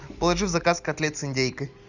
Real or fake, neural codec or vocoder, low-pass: real; none; 7.2 kHz